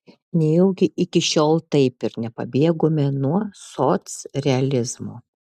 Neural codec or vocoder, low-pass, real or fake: none; 14.4 kHz; real